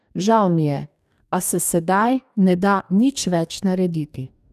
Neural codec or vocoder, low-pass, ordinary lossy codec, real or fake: codec, 44.1 kHz, 2.6 kbps, DAC; 14.4 kHz; none; fake